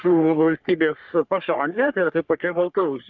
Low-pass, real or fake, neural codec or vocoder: 7.2 kHz; fake; codec, 44.1 kHz, 3.4 kbps, Pupu-Codec